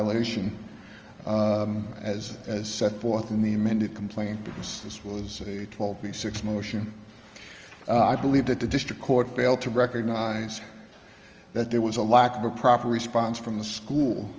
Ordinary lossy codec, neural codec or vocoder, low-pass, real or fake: Opus, 24 kbps; none; 7.2 kHz; real